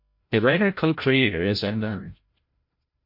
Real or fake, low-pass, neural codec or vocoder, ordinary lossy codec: fake; 5.4 kHz; codec, 16 kHz, 0.5 kbps, FreqCodec, larger model; MP3, 32 kbps